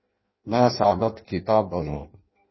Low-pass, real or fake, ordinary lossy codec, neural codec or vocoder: 7.2 kHz; fake; MP3, 24 kbps; codec, 16 kHz in and 24 kHz out, 0.6 kbps, FireRedTTS-2 codec